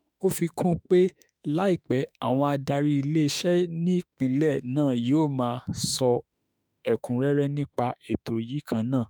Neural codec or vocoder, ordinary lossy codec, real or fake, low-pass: autoencoder, 48 kHz, 32 numbers a frame, DAC-VAE, trained on Japanese speech; none; fake; none